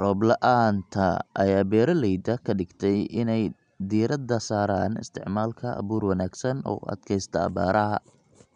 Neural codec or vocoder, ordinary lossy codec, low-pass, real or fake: none; none; 9.9 kHz; real